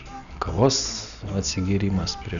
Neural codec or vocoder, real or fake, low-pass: none; real; 7.2 kHz